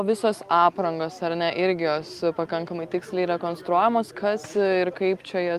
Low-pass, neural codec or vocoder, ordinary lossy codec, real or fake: 14.4 kHz; none; Opus, 32 kbps; real